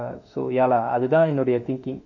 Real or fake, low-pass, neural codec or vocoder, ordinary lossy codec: fake; 7.2 kHz; autoencoder, 48 kHz, 32 numbers a frame, DAC-VAE, trained on Japanese speech; none